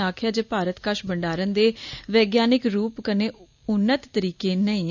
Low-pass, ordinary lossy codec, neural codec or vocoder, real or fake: 7.2 kHz; none; none; real